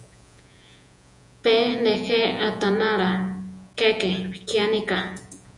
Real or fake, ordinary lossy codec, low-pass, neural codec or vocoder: fake; MP3, 96 kbps; 10.8 kHz; vocoder, 48 kHz, 128 mel bands, Vocos